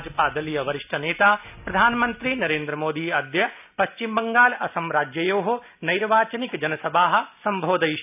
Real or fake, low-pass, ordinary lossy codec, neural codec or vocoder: real; 3.6 kHz; none; none